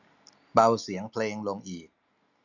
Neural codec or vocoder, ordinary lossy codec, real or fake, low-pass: none; none; real; 7.2 kHz